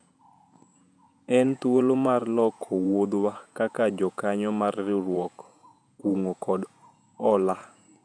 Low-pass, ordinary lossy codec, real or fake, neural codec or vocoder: 9.9 kHz; none; fake; vocoder, 48 kHz, 128 mel bands, Vocos